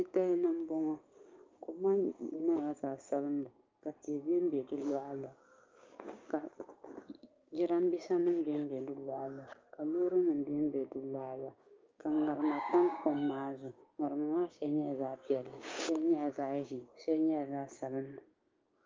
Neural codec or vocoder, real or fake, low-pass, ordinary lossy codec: codec, 16 kHz, 6 kbps, DAC; fake; 7.2 kHz; Opus, 32 kbps